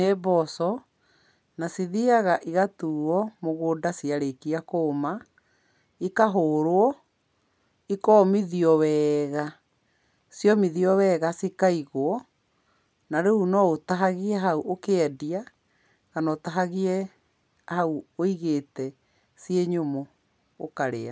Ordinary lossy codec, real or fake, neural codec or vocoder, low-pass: none; real; none; none